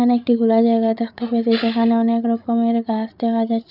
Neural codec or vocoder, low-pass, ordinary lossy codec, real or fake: codec, 16 kHz, 8 kbps, FreqCodec, larger model; 5.4 kHz; none; fake